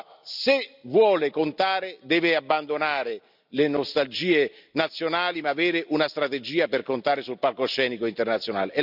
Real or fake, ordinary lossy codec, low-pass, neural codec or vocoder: real; none; 5.4 kHz; none